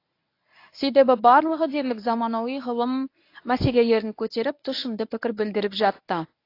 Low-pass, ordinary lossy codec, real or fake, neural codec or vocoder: 5.4 kHz; AAC, 32 kbps; fake; codec, 24 kHz, 0.9 kbps, WavTokenizer, medium speech release version 1